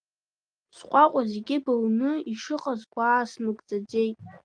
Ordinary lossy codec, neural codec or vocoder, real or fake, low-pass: Opus, 32 kbps; none; real; 9.9 kHz